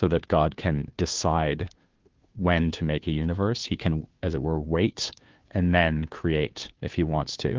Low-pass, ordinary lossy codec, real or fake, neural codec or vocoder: 7.2 kHz; Opus, 32 kbps; fake; codec, 16 kHz, 2 kbps, FunCodec, trained on Chinese and English, 25 frames a second